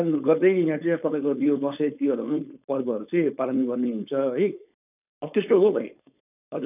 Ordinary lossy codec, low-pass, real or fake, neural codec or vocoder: none; 3.6 kHz; fake; codec, 16 kHz, 4.8 kbps, FACodec